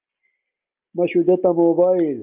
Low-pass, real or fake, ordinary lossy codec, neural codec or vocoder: 3.6 kHz; real; Opus, 24 kbps; none